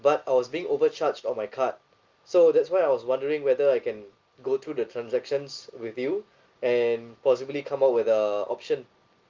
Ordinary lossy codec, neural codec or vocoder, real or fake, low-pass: Opus, 32 kbps; none; real; 7.2 kHz